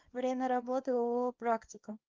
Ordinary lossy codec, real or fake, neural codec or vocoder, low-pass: Opus, 24 kbps; fake; codec, 24 kHz, 0.9 kbps, WavTokenizer, small release; 7.2 kHz